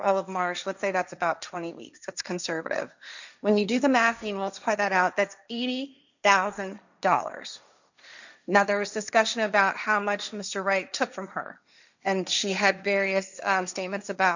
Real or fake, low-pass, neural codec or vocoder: fake; 7.2 kHz; codec, 16 kHz, 1.1 kbps, Voila-Tokenizer